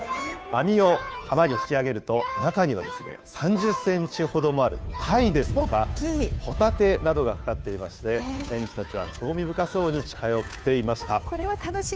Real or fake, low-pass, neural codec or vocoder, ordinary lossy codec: fake; none; codec, 16 kHz, 2 kbps, FunCodec, trained on Chinese and English, 25 frames a second; none